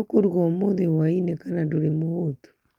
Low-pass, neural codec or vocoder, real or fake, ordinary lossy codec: 19.8 kHz; none; real; Opus, 32 kbps